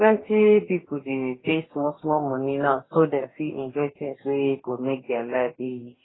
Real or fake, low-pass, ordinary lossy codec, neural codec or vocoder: fake; 7.2 kHz; AAC, 16 kbps; codec, 44.1 kHz, 2.6 kbps, DAC